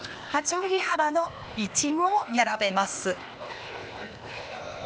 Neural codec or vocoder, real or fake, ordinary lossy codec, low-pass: codec, 16 kHz, 0.8 kbps, ZipCodec; fake; none; none